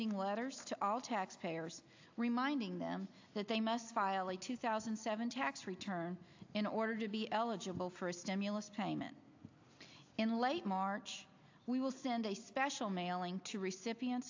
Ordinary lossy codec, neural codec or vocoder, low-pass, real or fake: AAC, 48 kbps; none; 7.2 kHz; real